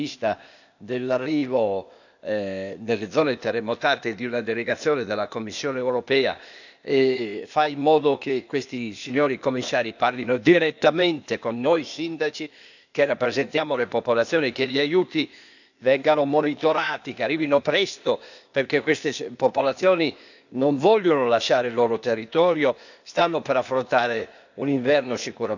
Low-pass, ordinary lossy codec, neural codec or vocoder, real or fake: 7.2 kHz; none; codec, 16 kHz, 0.8 kbps, ZipCodec; fake